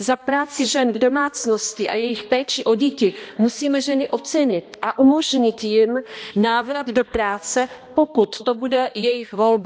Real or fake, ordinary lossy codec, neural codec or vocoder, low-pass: fake; none; codec, 16 kHz, 1 kbps, X-Codec, HuBERT features, trained on balanced general audio; none